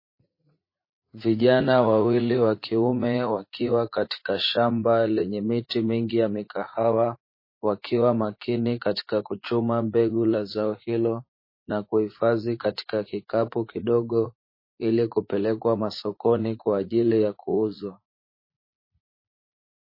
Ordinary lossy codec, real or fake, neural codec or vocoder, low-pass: MP3, 24 kbps; fake; vocoder, 44.1 kHz, 80 mel bands, Vocos; 5.4 kHz